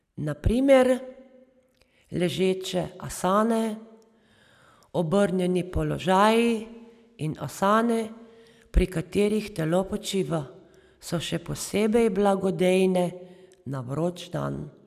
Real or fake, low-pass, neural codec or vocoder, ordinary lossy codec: real; 14.4 kHz; none; none